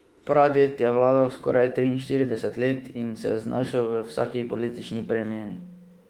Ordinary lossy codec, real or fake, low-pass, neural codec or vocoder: Opus, 32 kbps; fake; 19.8 kHz; autoencoder, 48 kHz, 32 numbers a frame, DAC-VAE, trained on Japanese speech